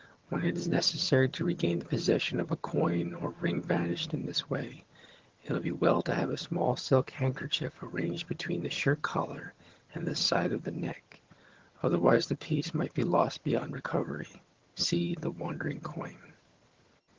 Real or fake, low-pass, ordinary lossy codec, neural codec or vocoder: fake; 7.2 kHz; Opus, 16 kbps; vocoder, 22.05 kHz, 80 mel bands, HiFi-GAN